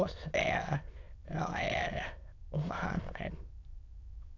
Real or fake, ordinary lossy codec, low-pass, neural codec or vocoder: fake; AAC, 48 kbps; 7.2 kHz; autoencoder, 22.05 kHz, a latent of 192 numbers a frame, VITS, trained on many speakers